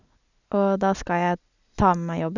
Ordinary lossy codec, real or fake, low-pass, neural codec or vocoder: none; real; 7.2 kHz; none